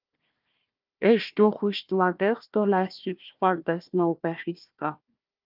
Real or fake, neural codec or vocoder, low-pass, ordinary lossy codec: fake; codec, 16 kHz, 1 kbps, FunCodec, trained on Chinese and English, 50 frames a second; 5.4 kHz; Opus, 24 kbps